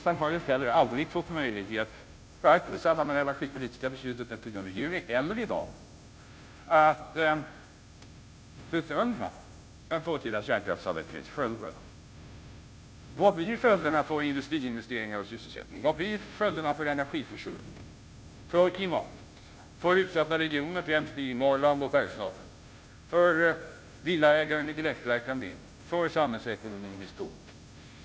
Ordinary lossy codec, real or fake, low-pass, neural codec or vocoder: none; fake; none; codec, 16 kHz, 0.5 kbps, FunCodec, trained on Chinese and English, 25 frames a second